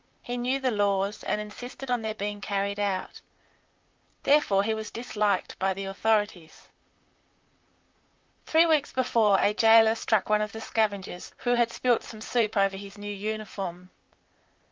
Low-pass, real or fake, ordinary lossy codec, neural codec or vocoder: 7.2 kHz; fake; Opus, 24 kbps; vocoder, 44.1 kHz, 128 mel bands, Pupu-Vocoder